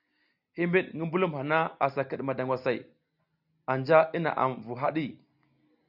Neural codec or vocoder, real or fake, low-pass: none; real; 5.4 kHz